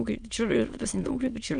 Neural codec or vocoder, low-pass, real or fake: autoencoder, 22.05 kHz, a latent of 192 numbers a frame, VITS, trained on many speakers; 9.9 kHz; fake